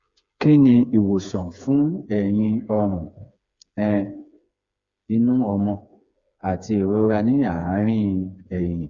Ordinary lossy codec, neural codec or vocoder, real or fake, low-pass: Opus, 64 kbps; codec, 16 kHz, 4 kbps, FreqCodec, smaller model; fake; 7.2 kHz